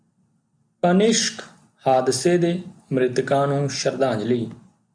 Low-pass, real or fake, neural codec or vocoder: 9.9 kHz; real; none